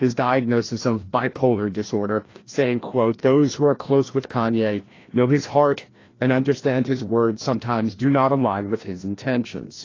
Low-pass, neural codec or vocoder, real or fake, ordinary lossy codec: 7.2 kHz; codec, 16 kHz, 1 kbps, FreqCodec, larger model; fake; AAC, 32 kbps